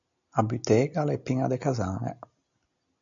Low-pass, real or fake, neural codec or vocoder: 7.2 kHz; real; none